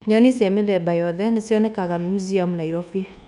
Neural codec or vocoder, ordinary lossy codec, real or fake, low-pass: codec, 24 kHz, 1.2 kbps, DualCodec; none; fake; 10.8 kHz